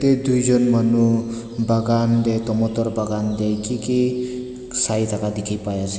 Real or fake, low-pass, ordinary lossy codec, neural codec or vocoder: real; none; none; none